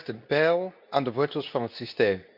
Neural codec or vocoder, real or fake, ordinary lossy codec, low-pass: codec, 24 kHz, 0.9 kbps, WavTokenizer, medium speech release version 2; fake; none; 5.4 kHz